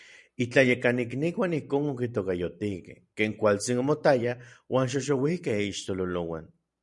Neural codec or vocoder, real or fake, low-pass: vocoder, 44.1 kHz, 128 mel bands every 512 samples, BigVGAN v2; fake; 10.8 kHz